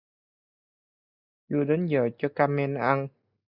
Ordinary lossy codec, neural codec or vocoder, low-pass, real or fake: Opus, 64 kbps; none; 5.4 kHz; real